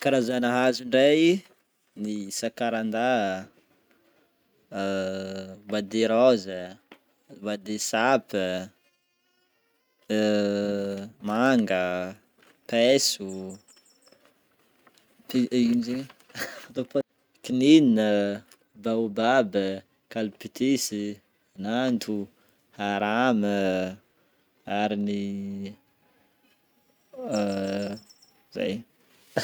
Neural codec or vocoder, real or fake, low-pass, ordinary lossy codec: none; real; none; none